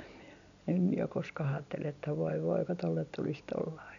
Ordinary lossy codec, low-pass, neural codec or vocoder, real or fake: none; 7.2 kHz; none; real